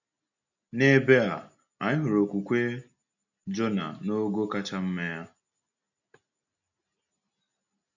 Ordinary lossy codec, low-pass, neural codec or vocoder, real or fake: none; 7.2 kHz; none; real